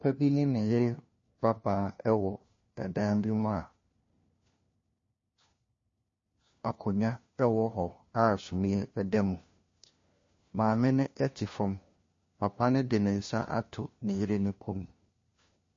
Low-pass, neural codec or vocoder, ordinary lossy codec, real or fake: 7.2 kHz; codec, 16 kHz, 1 kbps, FunCodec, trained on LibriTTS, 50 frames a second; MP3, 32 kbps; fake